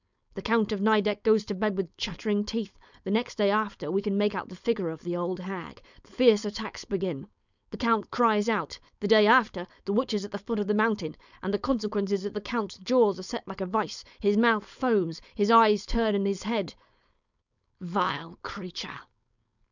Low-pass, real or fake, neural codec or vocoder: 7.2 kHz; fake; codec, 16 kHz, 4.8 kbps, FACodec